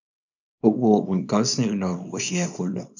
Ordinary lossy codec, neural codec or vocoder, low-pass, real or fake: none; codec, 24 kHz, 0.9 kbps, WavTokenizer, small release; 7.2 kHz; fake